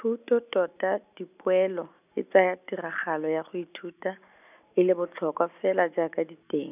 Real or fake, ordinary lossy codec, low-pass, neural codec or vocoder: real; none; 3.6 kHz; none